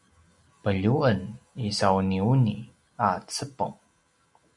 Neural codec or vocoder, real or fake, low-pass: none; real; 10.8 kHz